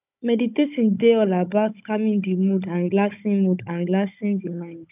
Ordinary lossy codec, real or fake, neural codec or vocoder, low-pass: none; fake; codec, 16 kHz, 16 kbps, FunCodec, trained on Chinese and English, 50 frames a second; 3.6 kHz